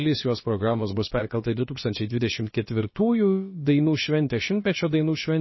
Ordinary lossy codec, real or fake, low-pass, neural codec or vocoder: MP3, 24 kbps; fake; 7.2 kHz; codec, 16 kHz, about 1 kbps, DyCAST, with the encoder's durations